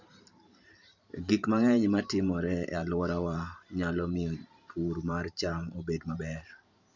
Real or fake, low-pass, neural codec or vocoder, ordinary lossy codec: fake; 7.2 kHz; vocoder, 44.1 kHz, 128 mel bands every 512 samples, BigVGAN v2; none